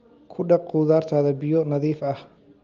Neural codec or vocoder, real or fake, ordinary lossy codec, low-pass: none; real; Opus, 32 kbps; 7.2 kHz